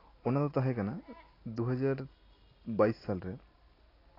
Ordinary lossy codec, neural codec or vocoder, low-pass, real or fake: AAC, 24 kbps; none; 5.4 kHz; real